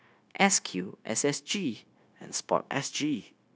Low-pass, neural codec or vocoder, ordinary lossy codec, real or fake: none; codec, 16 kHz, 0.9 kbps, LongCat-Audio-Codec; none; fake